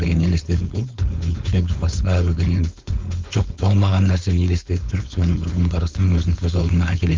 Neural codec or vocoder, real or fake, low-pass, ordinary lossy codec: codec, 16 kHz, 4.8 kbps, FACodec; fake; 7.2 kHz; Opus, 24 kbps